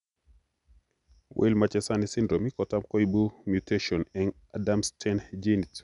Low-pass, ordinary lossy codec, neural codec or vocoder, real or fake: 10.8 kHz; none; none; real